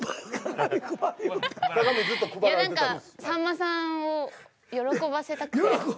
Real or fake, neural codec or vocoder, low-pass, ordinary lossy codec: real; none; none; none